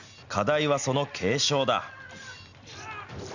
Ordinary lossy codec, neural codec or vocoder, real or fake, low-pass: none; none; real; 7.2 kHz